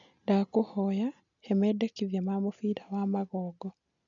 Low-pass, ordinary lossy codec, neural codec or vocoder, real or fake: 7.2 kHz; none; none; real